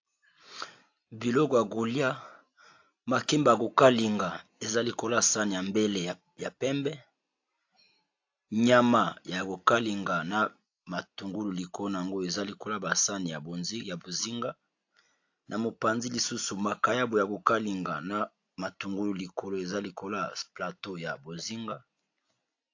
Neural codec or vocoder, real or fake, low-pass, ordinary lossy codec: none; real; 7.2 kHz; AAC, 48 kbps